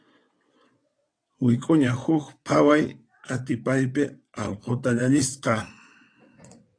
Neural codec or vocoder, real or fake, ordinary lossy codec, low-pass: vocoder, 22.05 kHz, 80 mel bands, WaveNeXt; fake; AAC, 48 kbps; 9.9 kHz